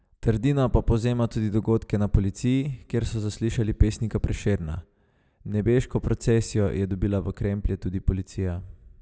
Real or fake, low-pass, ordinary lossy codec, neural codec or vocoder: real; none; none; none